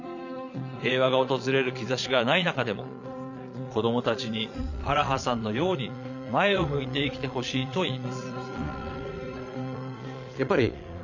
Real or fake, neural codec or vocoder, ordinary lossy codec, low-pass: fake; vocoder, 22.05 kHz, 80 mel bands, Vocos; none; 7.2 kHz